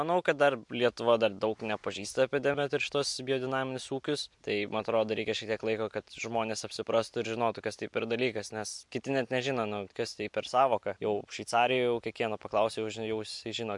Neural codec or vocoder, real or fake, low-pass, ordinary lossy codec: none; real; 10.8 kHz; MP3, 64 kbps